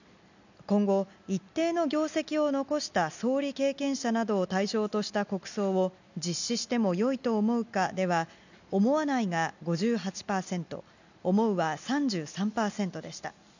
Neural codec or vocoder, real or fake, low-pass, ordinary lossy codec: none; real; 7.2 kHz; none